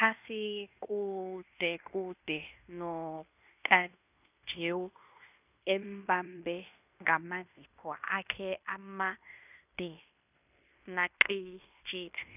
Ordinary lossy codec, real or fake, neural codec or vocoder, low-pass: none; fake; codec, 16 kHz in and 24 kHz out, 0.9 kbps, LongCat-Audio-Codec, fine tuned four codebook decoder; 3.6 kHz